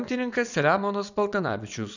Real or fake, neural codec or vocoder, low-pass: fake; vocoder, 22.05 kHz, 80 mel bands, WaveNeXt; 7.2 kHz